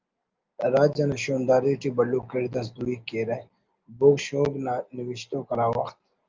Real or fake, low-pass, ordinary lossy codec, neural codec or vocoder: real; 7.2 kHz; Opus, 32 kbps; none